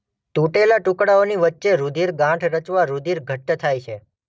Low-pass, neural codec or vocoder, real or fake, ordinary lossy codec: none; none; real; none